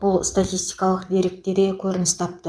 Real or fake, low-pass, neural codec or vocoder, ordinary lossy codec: fake; none; vocoder, 22.05 kHz, 80 mel bands, WaveNeXt; none